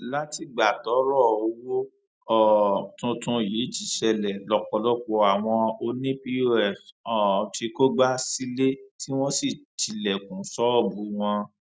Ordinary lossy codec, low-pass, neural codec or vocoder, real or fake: none; none; none; real